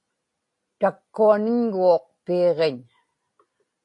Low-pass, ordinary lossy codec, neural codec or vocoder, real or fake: 10.8 kHz; AAC, 48 kbps; none; real